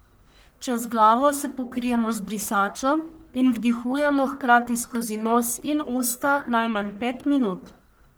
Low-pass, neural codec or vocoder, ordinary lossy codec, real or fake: none; codec, 44.1 kHz, 1.7 kbps, Pupu-Codec; none; fake